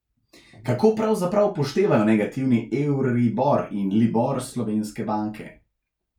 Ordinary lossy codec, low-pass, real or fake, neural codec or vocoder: none; 19.8 kHz; real; none